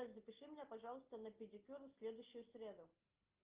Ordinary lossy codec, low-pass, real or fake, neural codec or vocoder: Opus, 32 kbps; 3.6 kHz; real; none